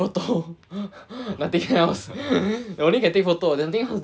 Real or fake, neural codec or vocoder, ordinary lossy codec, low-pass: real; none; none; none